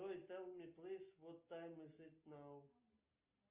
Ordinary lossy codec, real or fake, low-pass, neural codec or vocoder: Opus, 32 kbps; real; 3.6 kHz; none